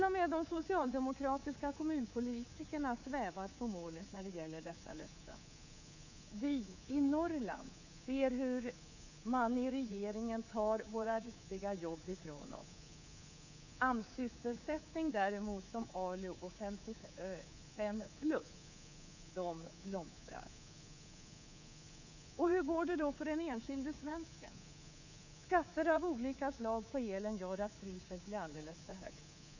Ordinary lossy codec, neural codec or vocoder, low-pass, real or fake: none; codec, 24 kHz, 3.1 kbps, DualCodec; 7.2 kHz; fake